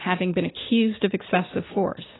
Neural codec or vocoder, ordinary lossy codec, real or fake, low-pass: none; AAC, 16 kbps; real; 7.2 kHz